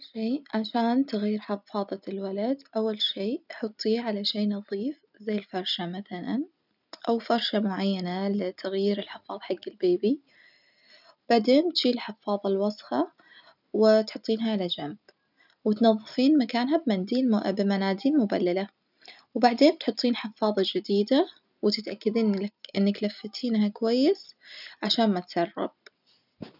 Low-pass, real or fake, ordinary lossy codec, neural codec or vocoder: 5.4 kHz; real; none; none